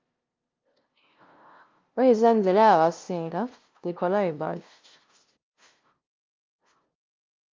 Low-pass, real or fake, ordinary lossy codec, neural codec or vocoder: 7.2 kHz; fake; Opus, 24 kbps; codec, 16 kHz, 0.5 kbps, FunCodec, trained on LibriTTS, 25 frames a second